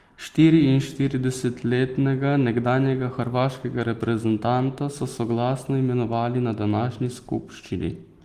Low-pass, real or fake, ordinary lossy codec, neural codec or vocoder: 14.4 kHz; real; Opus, 24 kbps; none